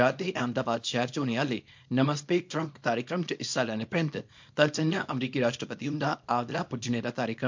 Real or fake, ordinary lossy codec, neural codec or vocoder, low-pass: fake; MP3, 48 kbps; codec, 24 kHz, 0.9 kbps, WavTokenizer, small release; 7.2 kHz